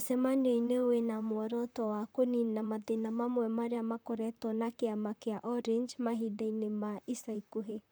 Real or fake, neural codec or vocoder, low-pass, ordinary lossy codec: fake; vocoder, 44.1 kHz, 128 mel bands, Pupu-Vocoder; none; none